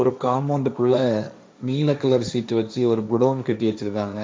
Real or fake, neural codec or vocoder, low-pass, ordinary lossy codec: fake; codec, 16 kHz, 1.1 kbps, Voila-Tokenizer; none; none